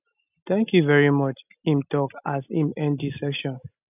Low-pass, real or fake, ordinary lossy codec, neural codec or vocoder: 3.6 kHz; real; none; none